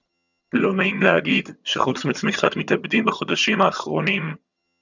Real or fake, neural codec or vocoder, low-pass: fake; vocoder, 22.05 kHz, 80 mel bands, HiFi-GAN; 7.2 kHz